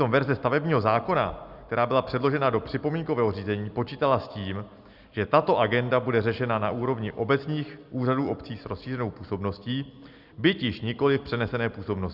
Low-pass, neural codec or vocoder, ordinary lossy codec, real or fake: 5.4 kHz; none; Opus, 64 kbps; real